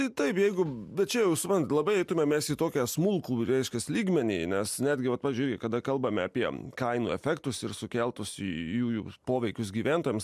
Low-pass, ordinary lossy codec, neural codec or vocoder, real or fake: 14.4 kHz; MP3, 96 kbps; none; real